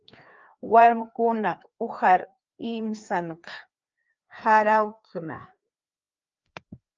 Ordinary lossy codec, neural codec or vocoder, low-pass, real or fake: Opus, 24 kbps; codec, 16 kHz, 2 kbps, FreqCodec, larger model; 7.2 kHz; fake